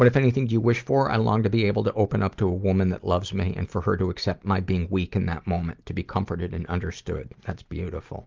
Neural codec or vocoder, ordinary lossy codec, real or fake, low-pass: none; Opus, 32 kbps; real; 7.2 kHz